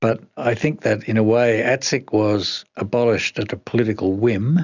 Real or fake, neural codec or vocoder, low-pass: real; none; 7.2 kHz